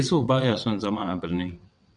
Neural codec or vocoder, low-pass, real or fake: vocoder, 22.05 kHz, 80 mel bands, WaveNeXt; 9.9 kHz; fake